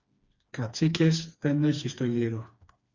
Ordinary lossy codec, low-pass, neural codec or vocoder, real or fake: Opus, 64 kbps; 7.2 kHz; codec, 16 kHz, 2 kbps, FreqCodec, smaller model; fake